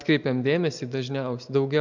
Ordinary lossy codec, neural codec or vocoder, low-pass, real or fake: MP3, 64 kbps; none; 7.2 kHz; real